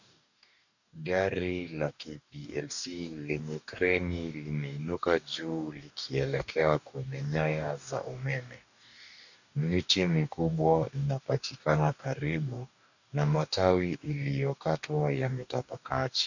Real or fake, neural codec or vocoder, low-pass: fake; codec, 44.1 kHz, 2.6 kbps, DAC; 7.2 kHz